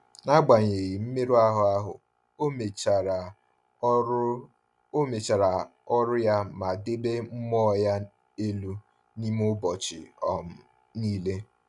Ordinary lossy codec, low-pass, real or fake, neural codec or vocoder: none; 10.8 kHz; real; none